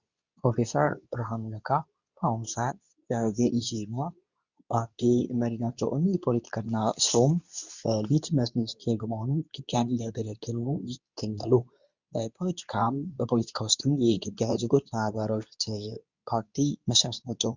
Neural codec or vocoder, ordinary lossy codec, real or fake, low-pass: codec, 24 kHz, 0.9 kbps, WavTokenizer, medium speech release version 2; Opus, 64 kbps; fake; 7.2 kHz